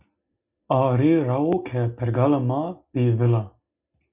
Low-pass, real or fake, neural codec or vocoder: 3.6 kHz; real; none